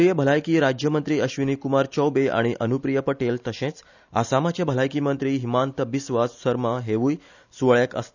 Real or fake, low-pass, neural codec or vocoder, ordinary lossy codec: real; 7.2 kHz; none; none